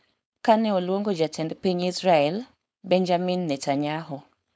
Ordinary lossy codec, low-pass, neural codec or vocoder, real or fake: none; none; codec, 16 kHz, 4.8 kbps, FACodec; fake